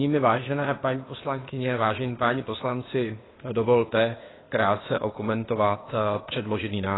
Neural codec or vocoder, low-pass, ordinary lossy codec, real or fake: codec, 16 kHz, 0.8 kbps, ZipCodec; 7.2 kHz; AAC, 16 kbps; fake